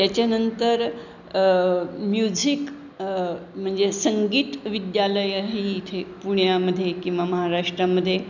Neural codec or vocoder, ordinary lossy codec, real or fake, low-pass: none; none; real; 7.2 kHz